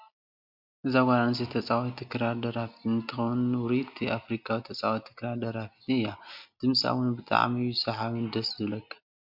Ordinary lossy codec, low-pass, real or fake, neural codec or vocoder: MP3, 48 kbps; 5.4 kHz; real; none